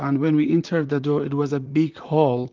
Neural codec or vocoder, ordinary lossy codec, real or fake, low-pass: vocoder, 44.1 kHz, 128 mel bands, Pupu-Vocoder; Opus, 24 kbps; fake; 7.2 kHz